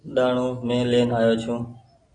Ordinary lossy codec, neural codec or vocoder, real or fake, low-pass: AAC, 32 kbps; none; real; 9.9 kHz